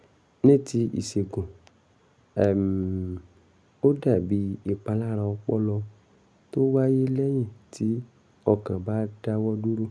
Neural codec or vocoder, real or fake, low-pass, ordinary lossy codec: none; real; none; none